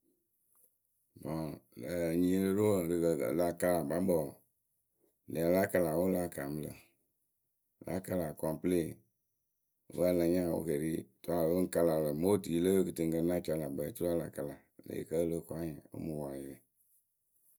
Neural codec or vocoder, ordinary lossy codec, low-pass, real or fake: none; none; none; real